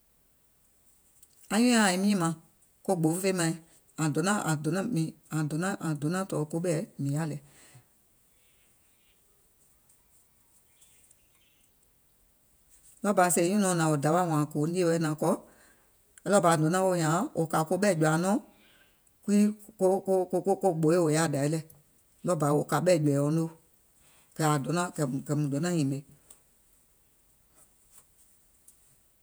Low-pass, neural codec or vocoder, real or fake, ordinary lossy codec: none; vocoder, 48 kHz, 128 mel bands, Vocos; fake; none